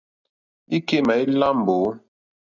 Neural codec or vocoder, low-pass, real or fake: none; 7.2 kHz; real